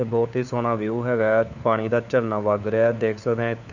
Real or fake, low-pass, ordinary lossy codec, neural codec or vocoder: fake; 7.2 kHz; none; codec, 16 kHz, 4 kbps, FunCodec, trained on LibriTTS, 50 frames a second